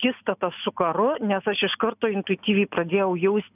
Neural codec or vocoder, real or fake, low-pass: none; real; 3.6 kHz